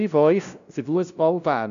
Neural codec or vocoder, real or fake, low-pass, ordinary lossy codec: codec, 16 kHz, 0.5 kbps, FunCodec, trained on LibriTTS, 25 frames a second; fake; 7.2 kHz; none